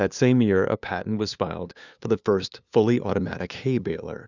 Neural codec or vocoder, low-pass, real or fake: codec, 16 kHz, 2 kbps, FunCodec, trained on LibriTTS, 25 frames a second; 7.2 kHz; fake